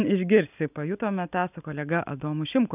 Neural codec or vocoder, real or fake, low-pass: none; real; 3.6 kHz